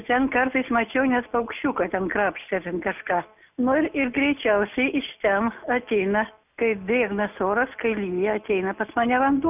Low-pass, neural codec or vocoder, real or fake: 3.6 kHz; none; real